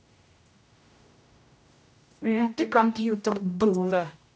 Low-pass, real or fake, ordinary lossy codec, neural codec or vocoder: none; fake; none; codec, 16 kHz, 0.5 kbps, X-Codec, HuBERT features, trained on general audio